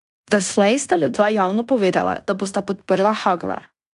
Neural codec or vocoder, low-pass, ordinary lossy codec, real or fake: codec, 16 kHz in and 24 kHz out, 0.9 kbps, LongCat-Audio-Codec, fine tuned four codebook decoder; 10.8 kHz; none; fake